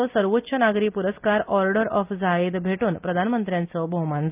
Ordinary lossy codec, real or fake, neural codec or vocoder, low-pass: Opus, 24 kbps; real; none; 3.6 kHz